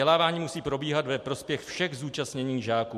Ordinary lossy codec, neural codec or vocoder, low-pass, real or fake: MP3, 64 kbps; none; 14.4 kHz; real